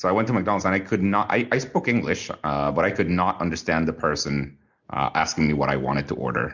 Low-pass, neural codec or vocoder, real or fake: 7.2 kHz; none; real